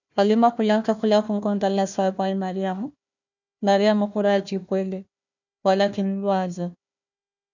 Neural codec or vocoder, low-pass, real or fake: codec, 16 kHz, 1 kbps, FunCodec, trained on Chinese and English, 50 frames a second; 7.2 kHz; fake